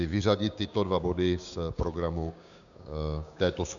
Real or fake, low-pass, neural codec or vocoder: fake; 7.2 kHz; codec, 16 kHz, 6 kbps, DAC